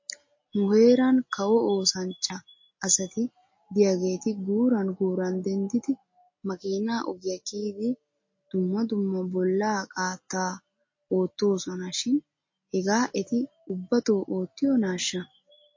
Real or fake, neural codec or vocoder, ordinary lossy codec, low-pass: real; none; MP3, 32 kbps; 7.2 kHz